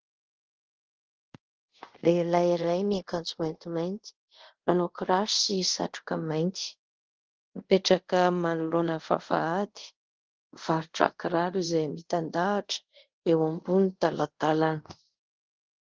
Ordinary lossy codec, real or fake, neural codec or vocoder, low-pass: Opus, 16 kbps; fake; codec, 24 kHz, 0.5 kbps, DualCodec; 7.2 kHz